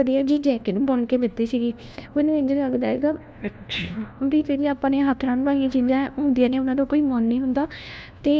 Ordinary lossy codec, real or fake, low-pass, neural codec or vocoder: none; fake; none; codec, 16 kHz, 1 kbps, FunCodec, trained on LibriTTS, 50 frames a second